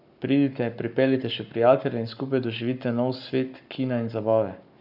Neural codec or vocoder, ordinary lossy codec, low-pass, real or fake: codec, 44.1 kHz, 7.8 kbps, Pupu-Codec; none; 5.4 kHz; fake